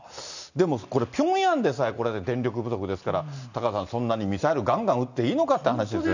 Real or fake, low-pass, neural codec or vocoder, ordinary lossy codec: real; 7.2 kHz; none; none